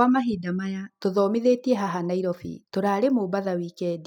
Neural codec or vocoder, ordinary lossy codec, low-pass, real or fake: none; none; 19.8 kHz; real